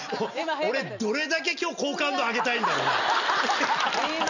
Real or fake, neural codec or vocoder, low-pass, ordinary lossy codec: real; none; 7.2 kHz; none